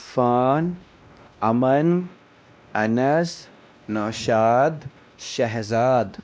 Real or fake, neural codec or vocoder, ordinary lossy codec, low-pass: fake; codec, 16 kHz, 1 kbps, X-Codec, WavLM features, trained on Multilingual LibriSpeech; none; none